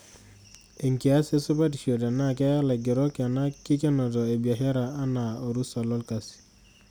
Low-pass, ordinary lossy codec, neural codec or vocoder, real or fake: none; none; none; real